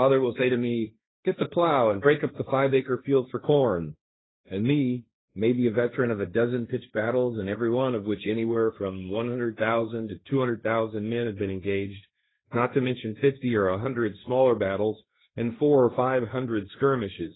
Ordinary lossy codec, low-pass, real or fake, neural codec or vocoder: AAC, 16 kbps; 7.2 kHz; fake; codec, 16 kHz, 1.1 kbps, Voila-Tokenizer